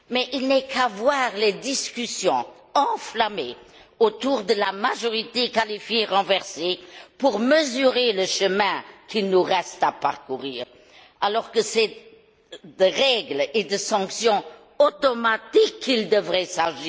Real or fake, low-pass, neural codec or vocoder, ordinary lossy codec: real; none; none; none